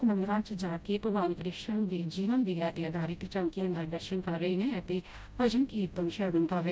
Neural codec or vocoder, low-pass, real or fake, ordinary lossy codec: codec, 16 kHz, 0.5 kbps, FreqCodec, smaller model; none; fake; none